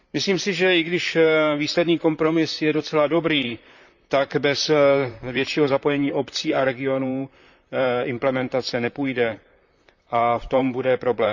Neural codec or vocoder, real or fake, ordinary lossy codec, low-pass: vocoder, 44.1 kHz, 128 mel bands, Pupu-Vocoder; fake; none; 7.2 kHz